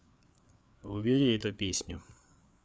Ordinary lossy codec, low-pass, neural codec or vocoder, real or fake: none; none; codec, 16 kHz, 4 kbps, FreqCodec, larger model; fake